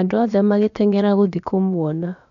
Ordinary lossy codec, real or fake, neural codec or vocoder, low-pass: none; fake; codec, 16 kHz, about 1 kbps, DyCAST, with the encoder's durations; 7.2 kHz